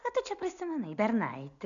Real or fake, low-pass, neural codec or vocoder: real; 7.2 kHz; none